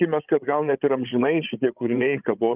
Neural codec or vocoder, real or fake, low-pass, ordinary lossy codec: codec, 16 kHz, 16 kbps, FreqCodec, larger model; fake; 3.6 kHz; Opus, 24 kbps